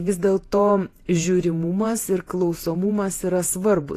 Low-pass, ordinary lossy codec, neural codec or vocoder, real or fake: 14.4 kHz; AAC, 48 kbps; vocoder, 48 kHz, 128 mel bands, Vocos; fake